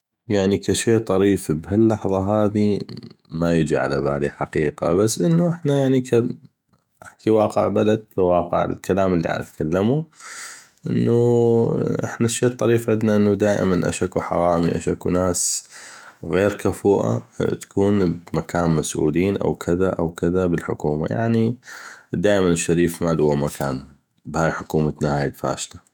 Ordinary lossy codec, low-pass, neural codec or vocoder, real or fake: none; 19.8 kHz; autoencoder, 48 kHz, 128 numbers a frame, DAC-VAE, trained on Japanese speech; fake